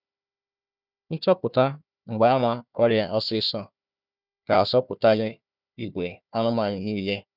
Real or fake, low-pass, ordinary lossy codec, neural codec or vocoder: fake; 5.4 kHz; none; codec, 16 kHz, 1 kbps, FunCodec, trained on Chinese and English, 50 frames a second